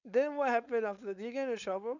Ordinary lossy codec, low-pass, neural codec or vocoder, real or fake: none; 7.2 kHz; codec, 16 kHz, 4.8 kbps, FACodec; fake